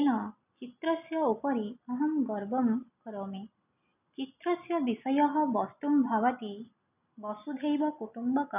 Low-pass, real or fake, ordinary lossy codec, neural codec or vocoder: 3.6 kHz; real; none; none